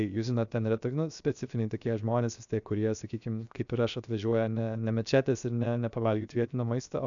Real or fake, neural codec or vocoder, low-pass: fake; codec, 16 kHz, 0.7 kbps, FocalCodec; 7.2 kHz